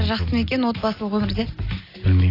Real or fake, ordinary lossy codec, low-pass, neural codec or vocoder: real; AAC, 24 kbps; 5.4 kHz; none